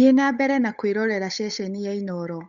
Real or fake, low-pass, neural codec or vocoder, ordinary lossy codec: fake; 7.2 kHz; codec, 16 kHz, 8 kbps, FunCodec, trained on Chinese and English, 25 frames a second; none